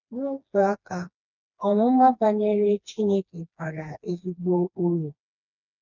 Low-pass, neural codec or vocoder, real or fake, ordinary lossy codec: 7.2 kHz; codec, 16 kHz, 2 kbps, FreqCodec, smaller model; fake; none